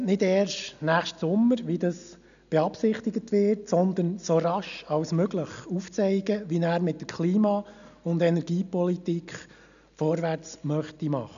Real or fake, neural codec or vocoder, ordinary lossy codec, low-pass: real; none; none; 7.2 kHz